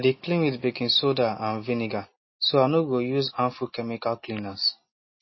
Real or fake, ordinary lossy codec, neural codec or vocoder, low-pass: real; MP3, 24 kbps; none; 7.2 kHz